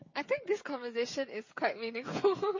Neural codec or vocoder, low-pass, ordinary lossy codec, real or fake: codec, 16 kHz, 16 kbps, FreqCodec, smaller model; 7.2 kHz; MP3, 32 kbps; fake